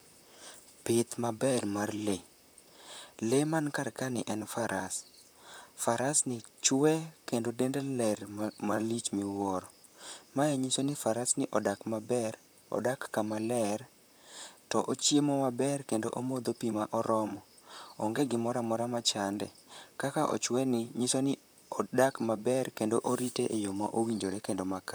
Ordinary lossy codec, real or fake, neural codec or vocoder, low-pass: none; fake; vocoder, 44.1 kHz, 128 mel bands, Pupu-Vocoder; none